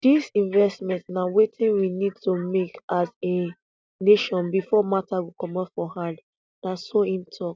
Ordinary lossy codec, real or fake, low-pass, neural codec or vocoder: none; real; none; none